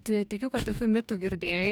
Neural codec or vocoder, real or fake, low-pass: codec, 44.1 kHz, 2.6 kbps, DAC; fake; 19.8 kHz